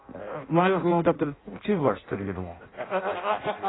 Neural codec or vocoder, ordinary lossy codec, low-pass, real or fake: codec, 16 kHz in and 24 kHz out, 0.6 kbps, FireRedTTS-2 codec; AAC, 16 kbps; 7.2 kHz; fake